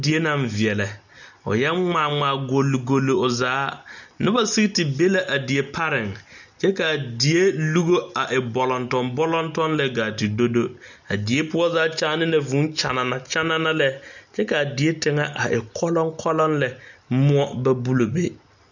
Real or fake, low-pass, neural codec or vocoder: real; 7.2 kHz; none